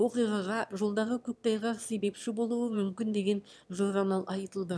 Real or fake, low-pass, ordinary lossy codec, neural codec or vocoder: fake; none; none; autoencoder, 22.05 kHz, a latent of 192 numbers a frame, VITS, trained on one speaker